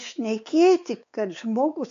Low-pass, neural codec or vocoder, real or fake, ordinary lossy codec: 7.2 kHz; codec, 16 kHz, 4 kbps, X-Codec, WavLM features, trained on Multilingual LibriSpeech; fake; AAC, 64 kbps